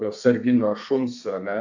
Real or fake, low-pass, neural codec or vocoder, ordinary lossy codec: fake; 7.2 kHz; codec, 32 kHz, 1.9 kbps, SNAC; AAC, 48 kbps